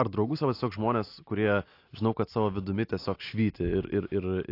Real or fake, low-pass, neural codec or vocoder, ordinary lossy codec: real; 5.4 kHz; none; AAC, 32 kbps